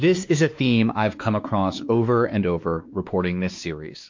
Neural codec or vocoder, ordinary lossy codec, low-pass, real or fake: codec, 16 kHz, 2 kbps, X-Codec, WavLM features, trained on Multilingual LibriSpeech; MP3, 48 kbps; 7.2 kHz; fake